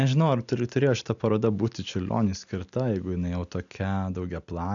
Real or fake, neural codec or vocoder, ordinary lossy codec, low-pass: real; none; MP3, 96 kbps; 7.2 kHz